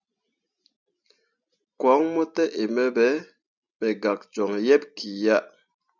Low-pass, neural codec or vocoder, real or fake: 7.2 kHz; none; real